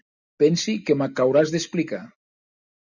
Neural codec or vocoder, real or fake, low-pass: none; real; 7.2 kHz